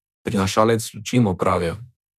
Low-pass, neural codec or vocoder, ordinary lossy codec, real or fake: 14.4 kHz; autoencoder, 48 kHz, 32 numbers a frame, DAC-VAE, trained on Japanese speech; none; fake